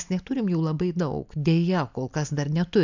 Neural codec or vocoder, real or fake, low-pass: none; real; 7.2 kHz